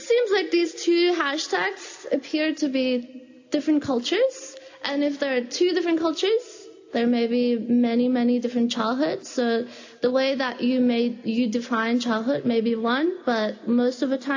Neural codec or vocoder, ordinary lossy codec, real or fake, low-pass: none; AAC, 48 kbps; real; 7.2 kHz